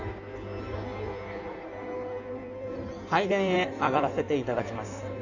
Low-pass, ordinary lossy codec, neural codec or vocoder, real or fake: 7.2 kHz; none; codec, 16 kHz in and 24 kHz out, 1.1 kbps, FireRedTTS-2 codec; fake